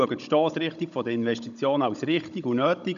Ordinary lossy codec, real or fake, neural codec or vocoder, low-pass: none; fake; codec, 16 kHz, 16 kbps, FunCodec, trained on Chinese and English, 50 frames a second; 7.2 kHz